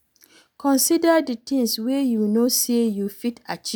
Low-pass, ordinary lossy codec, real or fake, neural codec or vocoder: none; none; real; none